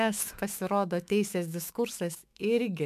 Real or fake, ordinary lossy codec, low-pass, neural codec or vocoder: fake; MP3, 96 kbps; 14.4 kHz; autoencoder, 48 kHz, 128 numbers a frame, DAC-VAE, trained on Japanese speech